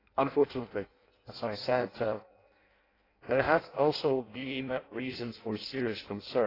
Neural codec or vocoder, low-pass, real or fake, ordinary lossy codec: codec, 16 kHz in and 24 kHz out, 0.6 kbps, FireRedTTS-2 codec; 5.4 kHz; fake; AAC, 24 kbps